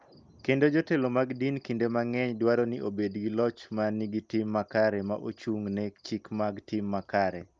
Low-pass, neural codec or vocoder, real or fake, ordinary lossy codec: 7.2 kHz; none; real; Opus, 24 kbps